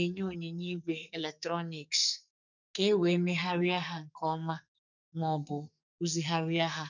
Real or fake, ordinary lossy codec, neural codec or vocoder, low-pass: fake; none; codec, 44.1 kHz, 2.6 kbps, SNAC; 7.2 kHz